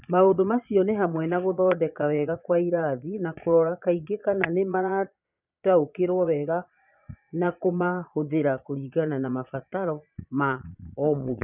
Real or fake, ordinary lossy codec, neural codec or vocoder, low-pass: fake; none; vocoder, 22.05 kHz, 80 mel bands, Vocos; 3.6 kHz